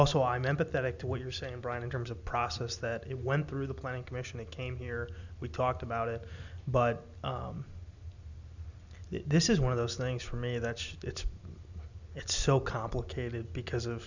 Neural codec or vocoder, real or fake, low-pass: none; real; 7.2 kHz